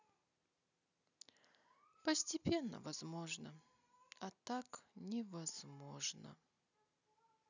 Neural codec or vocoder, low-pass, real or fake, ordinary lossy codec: none; 7.2 kHz; real; none